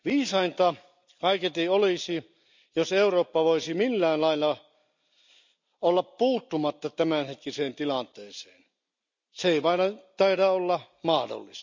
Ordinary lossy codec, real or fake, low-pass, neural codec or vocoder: MP3, 64 kbps; real; 7.2 kHz; none